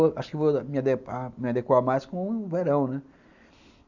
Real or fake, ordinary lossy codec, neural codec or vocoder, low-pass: real; none; none; 7.2 kHz